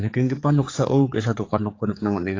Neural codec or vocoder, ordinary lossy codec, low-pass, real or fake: codec, 16 kHz, 4 kbps, X-Codec, HuBERT features, trained on general audio; AAC, 32 kbps; 7.2 kHz; fake